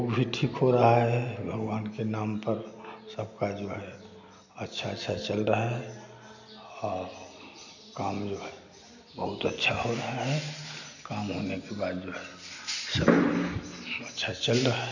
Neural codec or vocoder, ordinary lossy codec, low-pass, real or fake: none; none; 7.2 kHz; real